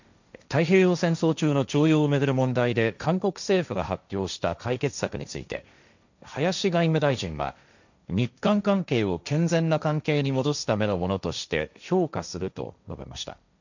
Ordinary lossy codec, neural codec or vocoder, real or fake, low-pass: none; codec, 16 kHz, 1.1 kbps, Voila-Tokenizer; fake; 7.2 kHz